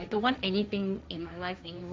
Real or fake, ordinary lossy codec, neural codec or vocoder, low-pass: fake; none; codec, 16 kHz, 1.1 kbps, Voila-Tokenizer; none